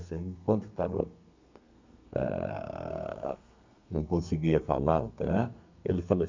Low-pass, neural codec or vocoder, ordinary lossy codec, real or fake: 7.2 kHz; codec, 32 kHz, 1.9 kbps, SNAC; MP3, 64 kbps; fake